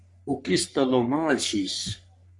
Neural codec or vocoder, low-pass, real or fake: codec, 44.1 kHz, 3.4 kbps, Pupu-Codec; 10.8 kHz; fake